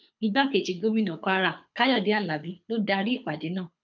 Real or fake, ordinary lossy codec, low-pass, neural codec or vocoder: fake; none; 7.2 kHz; codec, 24 kHz, 6 kbps, HILCodec